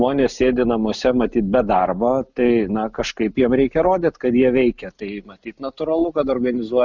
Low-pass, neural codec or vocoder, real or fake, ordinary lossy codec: 7.2 kHz; none; real; Opus, 64 kbps